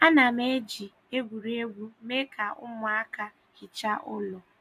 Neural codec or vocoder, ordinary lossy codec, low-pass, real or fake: none; none; 14.4 kHz; real